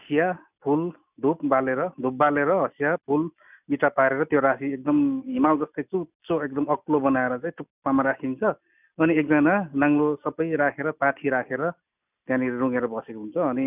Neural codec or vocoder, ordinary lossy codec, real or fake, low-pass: none; none; real; 3.6 kHz